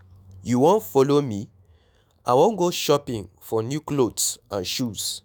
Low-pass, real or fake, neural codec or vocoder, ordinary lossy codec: none; fake; autoencoder, 48 kHz, 128 numbers a frame, DAC-VAE, trained on Japanese speech; none